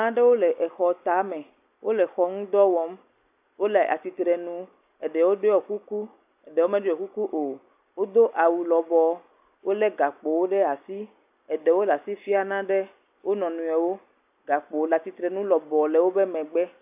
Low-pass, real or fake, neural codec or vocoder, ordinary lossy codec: 3.6 kHz; real; none; AAC, 32 kbps